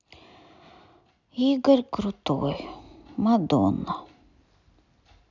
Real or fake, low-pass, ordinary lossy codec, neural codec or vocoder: real; 7.2 kHz; none; none